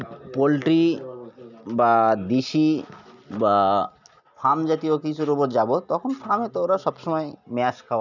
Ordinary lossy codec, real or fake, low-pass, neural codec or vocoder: none; real; 7.2 kHz; none